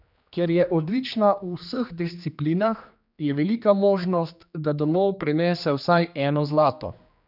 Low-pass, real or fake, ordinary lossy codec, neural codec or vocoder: 5.4 kHz; fake; none; codec, 16 kHz, 2 kbps, X-Codec, HuBERT features, trained on general audio